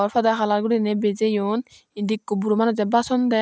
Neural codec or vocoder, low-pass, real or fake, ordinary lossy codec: none; none; real; none